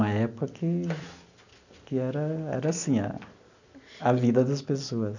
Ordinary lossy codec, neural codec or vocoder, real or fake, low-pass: none; none; real; 7.2 kHz